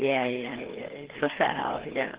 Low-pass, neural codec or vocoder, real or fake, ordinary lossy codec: 3.6 kHz; codec, 16 kHz, 2 kbps, FreqCodec, larger model; fake; Opus, 16 kbps